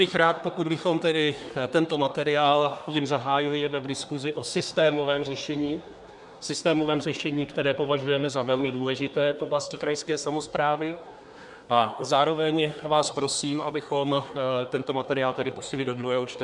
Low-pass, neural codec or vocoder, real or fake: 10.8 kHz; codec, 24 kHz, 1 kbps, SNAC; fake